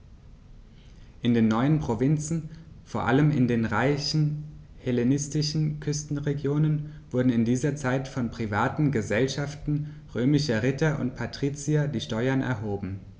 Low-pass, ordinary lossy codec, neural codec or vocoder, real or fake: none; none; none; real